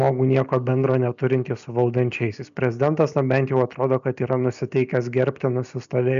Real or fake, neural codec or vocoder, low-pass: real; none; 7.2 kHz